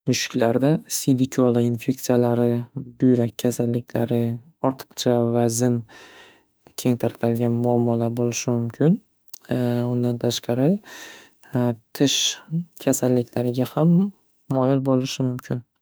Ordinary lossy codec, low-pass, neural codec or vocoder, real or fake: none; none; autoencoder, 48 kHz, 32 numbers a frame, DAC-VAE, trained on Japanese speech; fake